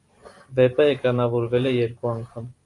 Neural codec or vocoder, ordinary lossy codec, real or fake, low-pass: vocoder, 44.1 kHz, 128 mel bands every 512 samples, BigVGAN v2; AAC, 64 kbps; fake; 10.8 kHz